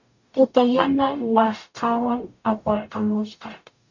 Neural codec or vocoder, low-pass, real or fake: codec, 44.1 kHz, 0.9 kbps, DAC; 7.2 kHz; fake